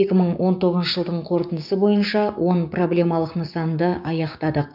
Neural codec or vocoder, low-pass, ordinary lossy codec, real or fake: codec, 16 kHz, 6 kbps, DAC; 5.4 kHz; none; fake